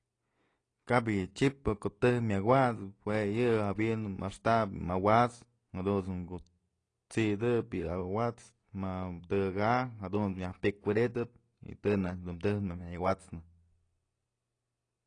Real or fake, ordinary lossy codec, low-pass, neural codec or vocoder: real; AAC, 32 kbps; 9.9 kHz; none